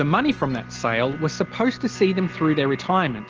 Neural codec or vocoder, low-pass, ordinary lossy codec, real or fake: none; 7.2 kHz; Opus, 16 kbps; real